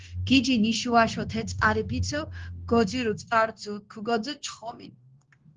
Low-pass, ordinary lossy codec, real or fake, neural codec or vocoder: 7.2 kHz; Opus, 16 kbps; fake; codec, 16 kHz, 0.9 kbps, LongCat-Audio-Codec